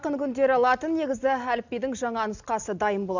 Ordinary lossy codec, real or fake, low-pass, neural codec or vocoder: none; real; 7.2 kHz; none